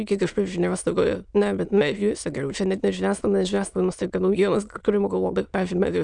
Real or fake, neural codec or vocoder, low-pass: fake; autoencoder, 22.05 kHz, a latent of 192 numbers a frame, VITS, trained on many speakers; 9.9 kHz